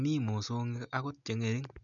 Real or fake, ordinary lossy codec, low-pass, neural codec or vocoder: real; none; 7.2 kHz; none